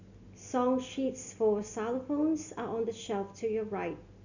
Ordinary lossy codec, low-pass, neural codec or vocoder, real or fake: none; 7.2 kHz; none; real